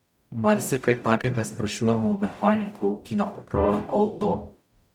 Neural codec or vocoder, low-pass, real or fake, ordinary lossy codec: codec, 44.1 kHz, 0.9 kbps, DAC; 19.8 kHz; fake; none